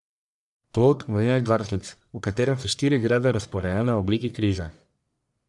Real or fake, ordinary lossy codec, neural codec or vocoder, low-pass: fake; none; codec, 44.1 kHz, 1.7 kbps, Pupu-Codec; 10.8 kHz